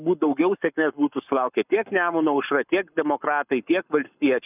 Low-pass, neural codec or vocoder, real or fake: 3.6 kHz; codec, 44.1 kHz, 7.8 kbps, Pupu-Codec; fake